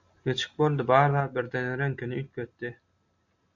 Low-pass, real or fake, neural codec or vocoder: 7.2 kHz; real; none